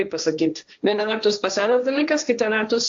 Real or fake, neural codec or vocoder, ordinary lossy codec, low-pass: fake; codec, 16 kHz, 1.1 kbps, Voila-Tokenizer; AAC, 64 kbps; 7.2 kHz